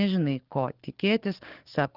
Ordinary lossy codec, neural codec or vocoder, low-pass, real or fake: Opus, 16 kbps; codec, 44.1 kHz, 7.8 kbps, DAC; 5.4 kHz; fake